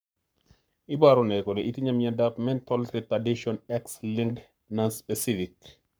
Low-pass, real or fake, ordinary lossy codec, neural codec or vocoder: none; fake; none; codec, 44.1 kHz, 7.8 kbps, Pupu-Codec